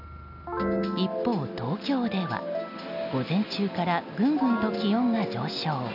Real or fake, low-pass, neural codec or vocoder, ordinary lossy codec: real; 5.4 kHz; none; none